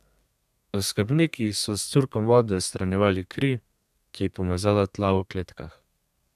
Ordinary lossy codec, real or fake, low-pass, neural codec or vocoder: none; fake; 14.4 kHz; codec, 32 kHz, 1.9 kbps, SNAC